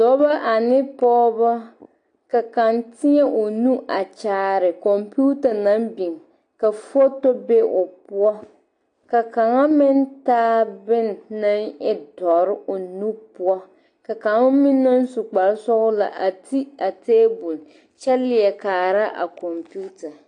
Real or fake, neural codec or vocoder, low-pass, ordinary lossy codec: real; none; 9.9 kHz; AAC, 48 kbps